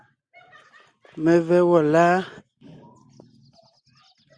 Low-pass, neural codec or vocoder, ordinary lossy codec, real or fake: 9.9 kHz; none; Opus, 64 kbps; real